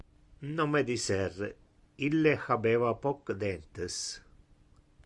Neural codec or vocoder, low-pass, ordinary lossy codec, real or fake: none; 10.8 kHz; Opus, 64 kbps; real